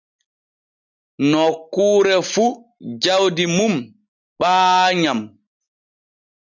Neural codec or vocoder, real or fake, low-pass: none; real; 7.2 kHz